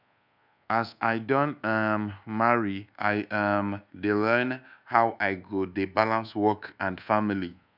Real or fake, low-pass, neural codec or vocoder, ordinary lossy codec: fake; 5.4 kHz; codec, 24 kHz, 1.2 kbps, DualCodec; none